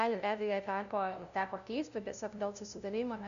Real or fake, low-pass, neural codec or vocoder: fake; 7.2 kHz; codec, 16 kHz, 0.5 kbps, FunCodec, trained on LibriTTS, 25 frames a second